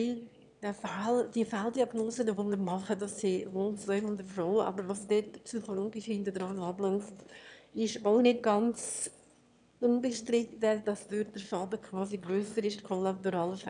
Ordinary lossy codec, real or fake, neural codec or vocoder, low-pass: none; fake; autoencoder, 22.05 kHz, a latent of 192 numbers a frame, VITS, trained on one speaker; 9.9 kHz